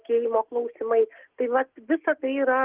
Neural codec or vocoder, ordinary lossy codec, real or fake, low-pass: none; Opus, 16 kbps; real; 3.6 kHz